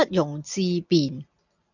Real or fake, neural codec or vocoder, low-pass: real; none; 7.2 kHz